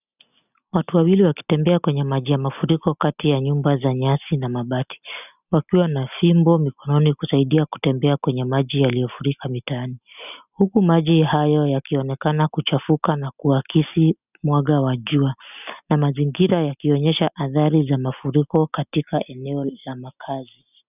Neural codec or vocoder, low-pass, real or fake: none; 3.6 kHz; real